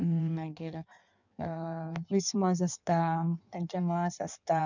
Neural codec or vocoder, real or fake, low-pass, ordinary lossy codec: codec, 16 kHz in and 24 kHz out, 1.1 kbps, FireRedTTS-2 codec; fake; 7.2 kHz; none